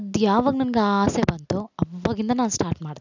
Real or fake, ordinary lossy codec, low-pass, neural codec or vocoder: real; none; 7.2 kHz; none